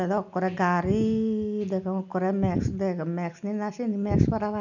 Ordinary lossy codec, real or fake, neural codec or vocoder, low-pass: none; real; none; 7.2 kHz